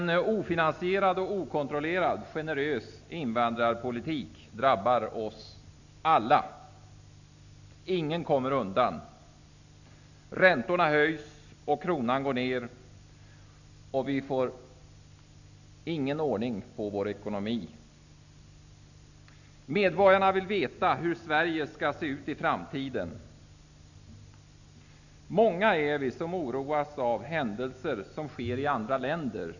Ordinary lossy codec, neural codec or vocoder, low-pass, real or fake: none; none; 7.2 kHz; real